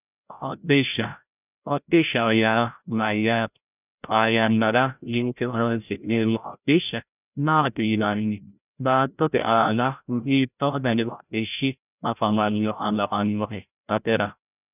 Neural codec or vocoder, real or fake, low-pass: codec, 16 kHz, 0.5 kbps, FreqCodec, larger model; fake; 3.6 kHz